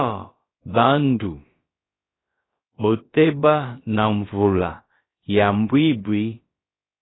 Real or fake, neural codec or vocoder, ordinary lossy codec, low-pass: fake; codec, 16 kHz, about 1 kbps, DyCAST, with the encoder's durations; AAC, 16 kbps; 7.2 kHz